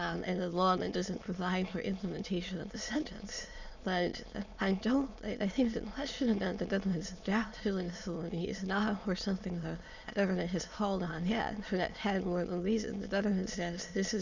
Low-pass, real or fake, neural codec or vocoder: 7.2 kHz; fake; autoencoder, 22.05 kHz, a latent of 192 numbers a frame, VITS, trained on many speakers